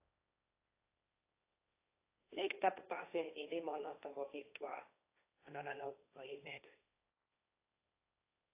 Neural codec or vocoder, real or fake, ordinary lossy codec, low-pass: codec, 16 kHz, 1.1 kbps, Voila-Tokenizer; fake; AAC, 24 kbps; 3.6 kHz